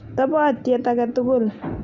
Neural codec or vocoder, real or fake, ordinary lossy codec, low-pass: none; real; Opus, 64 kbps; 7.2 kHz